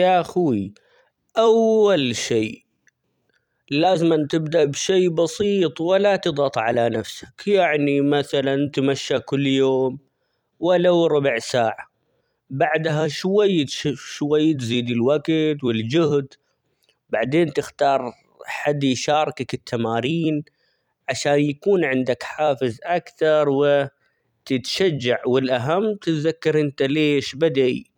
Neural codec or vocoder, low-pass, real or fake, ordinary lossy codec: vocoder, 44.1 kHz, 128 mel bands every 256 samples, BigVGAN v2; 19.8 kHz; fake; none